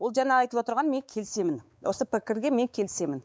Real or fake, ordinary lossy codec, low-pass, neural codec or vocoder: fake; none; none; codec, 16 kHz, 4 kbps, X-Codec, WavLM features, trained on Multilingual LibriSpeech